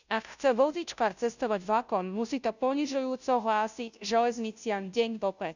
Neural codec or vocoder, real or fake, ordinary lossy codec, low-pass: codec, 16 kHz, 0.5 kbps, FunCodec, trained on Chinese and English, 25 frames a second; fake; none; 7.2 kHz